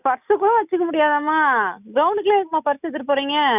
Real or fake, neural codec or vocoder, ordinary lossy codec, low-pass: real; none; none; 3.6 kHz